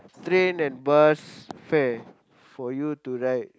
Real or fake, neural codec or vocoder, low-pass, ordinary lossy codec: real; none; none; none